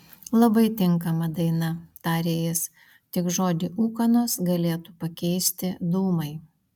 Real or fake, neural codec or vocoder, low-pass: real; none; 19.8 kHz